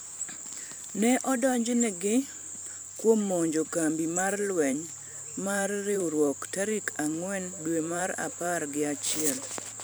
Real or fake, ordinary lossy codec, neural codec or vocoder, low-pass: fake; none; vocoder, 44.1 kHz, 128 mel bands every 512 samples, BigVGAN v2; none